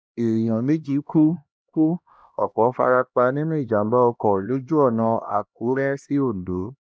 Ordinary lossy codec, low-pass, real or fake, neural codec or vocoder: none; none; fake; codec, 16 kHz, 1 kbps, X-Codec, HuBERT features, trained on LibriSpeech